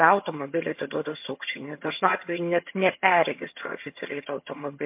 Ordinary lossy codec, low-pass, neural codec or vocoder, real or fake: MP3, 32 kbps; 3.6 kHz; vocoder, 22.05 kHz, 80 mel bands, HiFi-GAN; fake